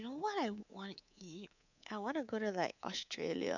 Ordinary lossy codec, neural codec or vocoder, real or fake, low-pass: none; codec, 24 kHz, 3.1 kbps, DualCodec; fake; 7.2 kHz